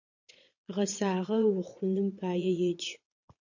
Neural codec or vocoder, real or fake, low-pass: vocoder, 22.05 kHz, 80 mel bands, Vocos; fake; 7.2 kHz